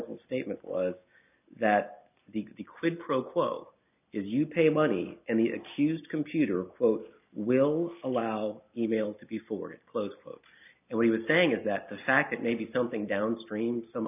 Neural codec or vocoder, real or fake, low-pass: none; real; 3.6 kHz